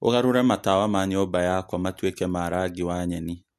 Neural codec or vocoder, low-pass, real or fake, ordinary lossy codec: none; 14.4 kHz; real; MP3, 64 kbps